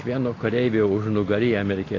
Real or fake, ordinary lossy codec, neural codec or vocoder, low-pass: real; AAC, 32 kbps; none; 7.2 kHz